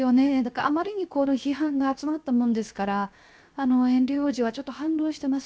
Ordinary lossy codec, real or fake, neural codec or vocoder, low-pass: none; fake; codec, 16 kHz, 0.7 kbps, FocalCodec; none